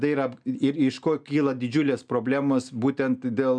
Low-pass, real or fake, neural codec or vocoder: 9.9 kHz; real; none